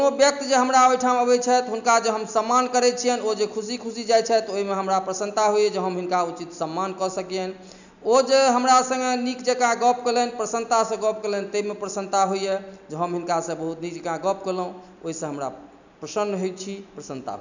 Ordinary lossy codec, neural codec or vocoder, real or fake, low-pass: none; none; real; 7.2 kHz